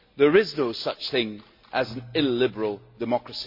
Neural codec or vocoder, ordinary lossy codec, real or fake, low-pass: none; MP3, 32 kbps; real; 5.4 kHz